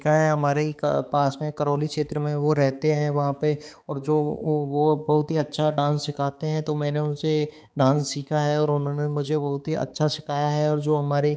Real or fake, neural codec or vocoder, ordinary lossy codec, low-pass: fake; codec, 16 kHz, 4 kbps, X-Codec, HuBERT features, trained on balanced general audio; none; none